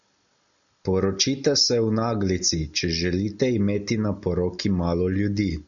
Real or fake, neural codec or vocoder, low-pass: real; none; 7.2 kHz